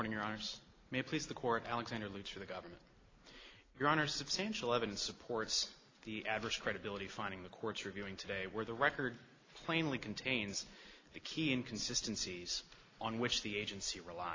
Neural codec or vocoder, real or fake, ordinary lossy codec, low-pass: none; real; AAC, 32 kbps; 7.2 kHz